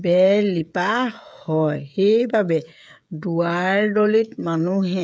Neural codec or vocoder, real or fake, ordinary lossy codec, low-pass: codec, 16 kHz, 16 kbps, FreqCodec, smaller model; fake; none; none